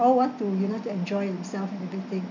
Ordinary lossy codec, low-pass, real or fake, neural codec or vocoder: none; 7.2 kHz; real; none